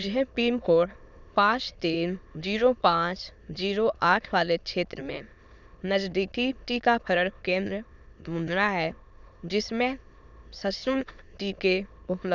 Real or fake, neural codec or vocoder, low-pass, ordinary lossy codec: fake; autoencoder, 22.05 kHz, a latent of 192 numbers a frame, VITS, trained on many speakers; 7.2 kHz; none